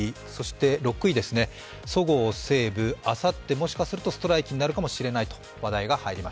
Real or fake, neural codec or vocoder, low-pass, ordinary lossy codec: real; none; none; none